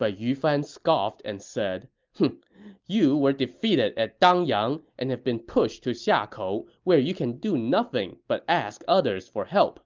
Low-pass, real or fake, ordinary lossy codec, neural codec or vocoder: 7.2 kHz; real; Opus, 24 kbps; none